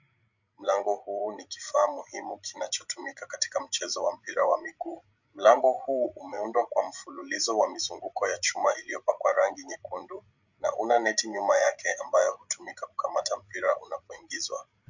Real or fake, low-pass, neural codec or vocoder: fake; 7.2 kHz; codec, 16 kHz, 16 kbps, FreqCodec, larger model